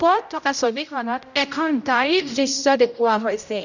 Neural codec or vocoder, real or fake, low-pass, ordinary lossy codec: codec, 16 kHz, 0.5 kbps, X-Codec, HuBERT features, trained on general audio; fake; 7.2 kHz; none